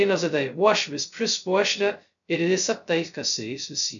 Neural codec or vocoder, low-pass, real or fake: codec, 16 kHz, 0.2 kbps, FocalCodec; 7.2 kHz; fake